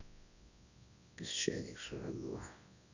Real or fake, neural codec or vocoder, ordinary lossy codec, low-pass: fake; codec, 24 kHz, 0.9 kbps, WavTokenizer, large speech release; none; 7.2 kHz